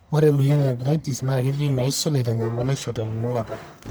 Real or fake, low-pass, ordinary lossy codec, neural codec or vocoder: fake; none; none; codec, 44.1 kHz, 1.7 kbps, Pupu-Codec